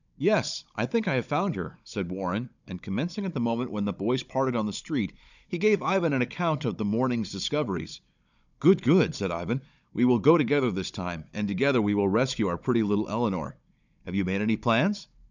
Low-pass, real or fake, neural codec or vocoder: 7.2 kHz; fake; codec, 16 kHz, 16 kbps, FunCodec, trained on Chinese and English, 50 frames a second